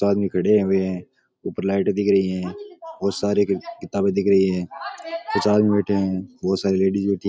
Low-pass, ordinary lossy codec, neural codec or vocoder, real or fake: none; none; none; real